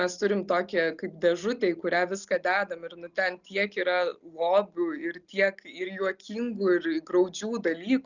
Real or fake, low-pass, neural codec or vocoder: real; 7.2 kHz; none